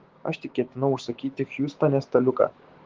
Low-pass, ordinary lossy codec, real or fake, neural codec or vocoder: 7.2 kHz; Opus, 16 kbps; fake; codec, 16 kHz, 6 kbps, DAC